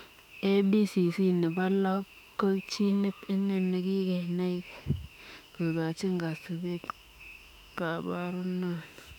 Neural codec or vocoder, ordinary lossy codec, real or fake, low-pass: autoencoder, 48 kHz, 32 numbers a frame, DAC-VAE, trained on Japanese speech; none; fake; 19.8 kHz